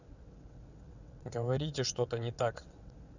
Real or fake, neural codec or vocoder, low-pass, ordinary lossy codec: fake; vocoder, 22.05 kHz, 80 mel bands, WaveNeXt; 7.2 kHz; none